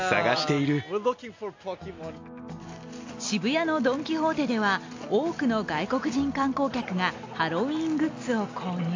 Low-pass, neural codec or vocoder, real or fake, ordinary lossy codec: 7.2 kHz; none; real; AAC, 48 kbps